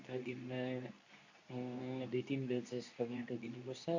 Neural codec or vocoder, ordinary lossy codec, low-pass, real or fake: codec, 24 kHz, 0.9 kbps, WavTokenizer, medium speech release version 2; AAC, 48 kbps; 7.2 kHz; fake